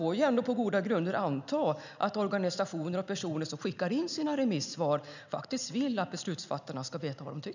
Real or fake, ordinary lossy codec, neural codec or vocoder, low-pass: real; none; none; 7.2 kHz